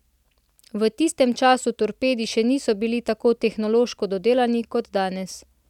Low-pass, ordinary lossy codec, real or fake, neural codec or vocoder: 19.8 kHz; none; real; none